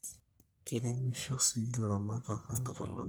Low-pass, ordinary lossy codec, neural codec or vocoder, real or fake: none; none; codec, 44.1 kHz, 1.7 kbps, Pupu-Codec; fake